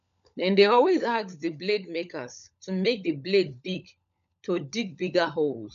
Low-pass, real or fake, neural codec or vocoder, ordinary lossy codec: 7.2 kHz; fake; codec, 16 kHz, 16 kbps, FunCodec, trained on LibriTTS, 50 frames a second; none